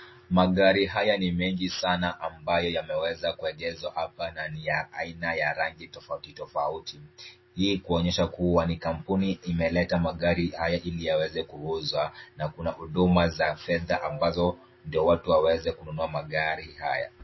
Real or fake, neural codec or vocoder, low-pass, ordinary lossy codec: real; none; 7.2 kHz; MP3, 24 kbps